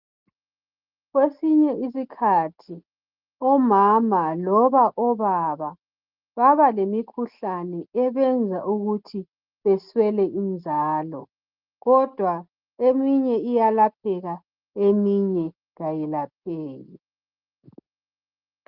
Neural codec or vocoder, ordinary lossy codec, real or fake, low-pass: none; Opus, 32 kbps; real; 5.4 kHz